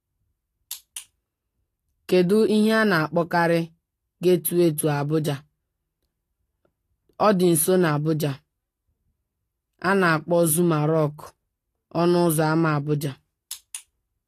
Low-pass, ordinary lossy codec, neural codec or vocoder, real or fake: 14.4 kHz; AAC, 48 kbps; none; real